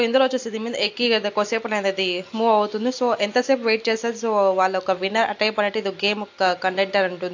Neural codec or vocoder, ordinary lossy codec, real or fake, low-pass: none; AAC, 48 kbps; real; 7.2 kHz